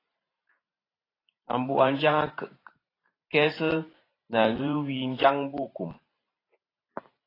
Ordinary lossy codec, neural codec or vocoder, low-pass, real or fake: AAC, 24 kbps; vocoder, 44.1 kHz, 128 mel bands every 512 samples, BigVGAN v2; 5.4 kHz; fake